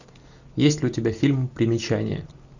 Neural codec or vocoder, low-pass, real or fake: none; 7.2 kHz; real